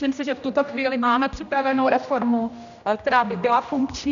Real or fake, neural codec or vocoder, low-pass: fake; codec, 16 kHz, 1 kbps, X-Codec, HuBERT features, trained on general audio; 7.2 kHz